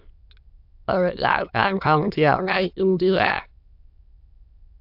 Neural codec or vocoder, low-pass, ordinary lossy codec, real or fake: autoencoder, 22.05 kHz, a latent of 192 numbers a frame, VITS, trained on many speakers; 5.4 kHz; AAC, 48 kbps; fake